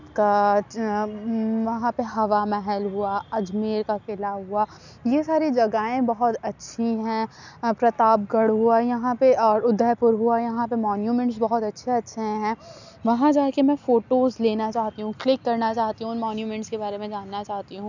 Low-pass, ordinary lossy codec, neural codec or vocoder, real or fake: 7.2 kHz; none; none; real